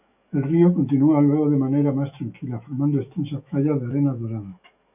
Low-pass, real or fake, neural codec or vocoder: 3.6 kHz; fake; autoencoder, 48 kHz, 128 numbers a frame, DAC-VAE, trained on Japanese speech